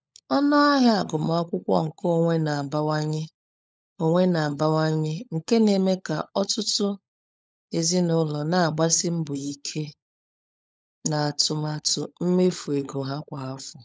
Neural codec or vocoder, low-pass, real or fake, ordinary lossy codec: codec, 16 kHz, 16 kbps, FunCodec, trained on LibriTTS, 50 frames a second; none; fake; none